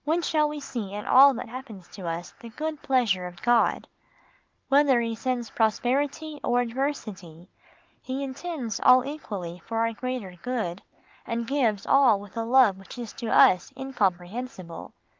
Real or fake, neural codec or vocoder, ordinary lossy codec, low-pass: real; none; Opus, 24 kbps; 7.2 kHz